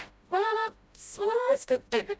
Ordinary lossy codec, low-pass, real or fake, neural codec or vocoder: none; none; fake; codec, 16 kHz, 0.5 kbps, FreqCodec, smaller model